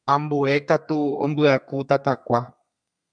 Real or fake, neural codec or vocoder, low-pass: fake; codec, 32 kHz, 1.9 kbps, SNAC; 9.9 kHz